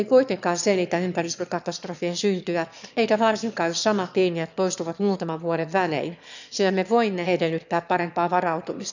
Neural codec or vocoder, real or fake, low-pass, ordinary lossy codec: autoencoder, 22.05 kHz, a latent of 192 numbers a frame, VITS, trained on one speaker; fake; 7.2 kHz; none